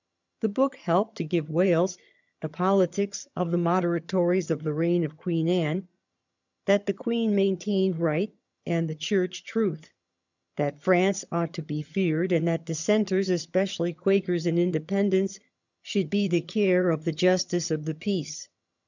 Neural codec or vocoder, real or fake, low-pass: vocoder, 22.05 kHz, 80 mel bands, HiFi-GAN; fake; 7.2 kHz